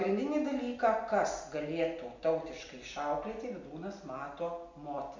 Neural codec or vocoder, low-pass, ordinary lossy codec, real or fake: none; 7.2 kHz; MP3, 48 kbps; real